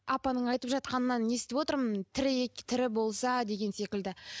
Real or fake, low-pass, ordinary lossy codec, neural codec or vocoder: real; none; none; none